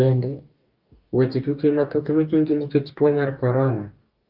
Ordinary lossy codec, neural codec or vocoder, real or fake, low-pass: Opus, 32 kbps; codec, 44.1 kHz, 2.6 kbps, DAC; fake; 5.4 kHz